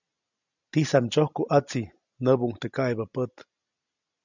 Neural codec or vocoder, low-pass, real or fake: none; 7.2 kHz; real